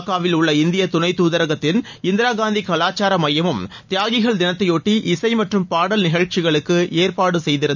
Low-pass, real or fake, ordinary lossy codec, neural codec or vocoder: 7.2 kHz; real; none; none